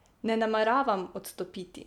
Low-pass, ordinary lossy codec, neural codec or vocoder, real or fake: 19.8 kHz; none; none; real